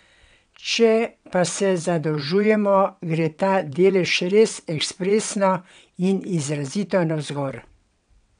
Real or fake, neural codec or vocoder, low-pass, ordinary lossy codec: fake; vocoder, 22.05 kHz, 80 mel bands, WaveNeXt; 9.9 kHz; none